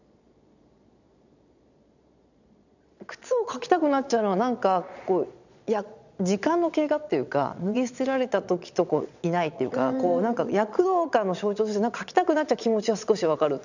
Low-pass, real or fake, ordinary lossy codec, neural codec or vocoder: 7.2 kHz; fake; none; vocoder, 22.05 kHz, 80 mel bands, Vocos